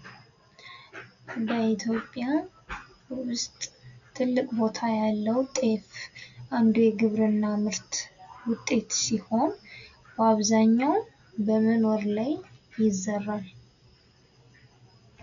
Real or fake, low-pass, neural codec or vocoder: real; 7.2 kHz; none